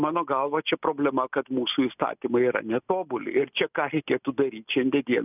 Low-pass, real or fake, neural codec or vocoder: 3.6 kHz; real; none